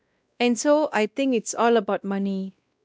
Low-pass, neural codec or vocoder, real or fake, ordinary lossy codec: none; codec, 16 kHz, 1 kbps, X-Codec, WavLM features, trained on Multilingual LibriSpeech; fake; none